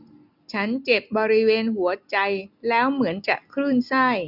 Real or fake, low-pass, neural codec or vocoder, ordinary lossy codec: real; 5.4 kHz; none; none